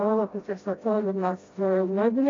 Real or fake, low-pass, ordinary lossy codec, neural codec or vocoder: fake; 7.2 kHz; AAC, 32 kbps; codec, 16 kHz, 0.5 kbps, FreqCodec, smaller model